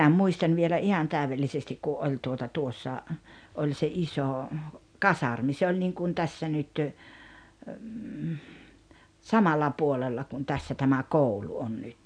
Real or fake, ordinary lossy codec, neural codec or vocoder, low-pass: real; none; none; 9.9 kHz